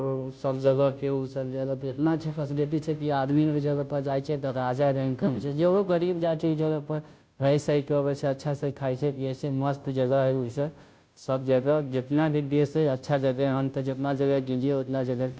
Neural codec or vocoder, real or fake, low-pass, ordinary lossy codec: codec, 16 kHz, 0.5 kbps, FunCodec, trained on Chinese and English, 25 frames a second; fake; none; none